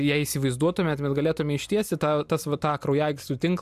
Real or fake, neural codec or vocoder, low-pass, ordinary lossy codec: real; none; 14.4 kHz; MP3, 96 kbps